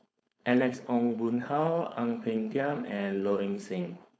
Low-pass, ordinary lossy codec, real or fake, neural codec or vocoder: none; none; fake; codec, 16 kHz, 4.8 kbps, FACodec